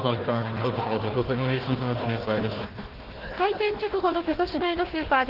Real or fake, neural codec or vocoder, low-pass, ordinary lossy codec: fake; codec, 16 kHz, 1 kbps, FunCodec, trained on Chinese and English, 50 frames a second; 5.4 kHz; Opus, 16 kbps